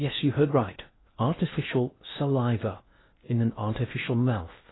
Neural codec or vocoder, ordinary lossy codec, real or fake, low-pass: codec, 16 kHz in and 24 kHz out, 0.6 kbps, FocalCodec, streaming, 2048 codes; AAC, 16 kbps; fake; 7.2 kHz